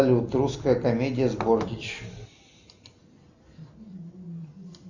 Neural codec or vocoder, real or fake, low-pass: none; real; 7.2 kHz